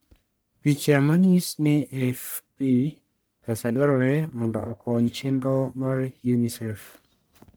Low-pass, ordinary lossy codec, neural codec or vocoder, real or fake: none; none; codec, 44.1 kHz, 1.7 kbps, Pupu-Codec; fake